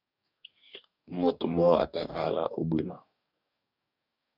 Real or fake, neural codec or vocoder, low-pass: fake; codec, 44.1 kHz, 2.6 kbps, DAC; 5.4 kHz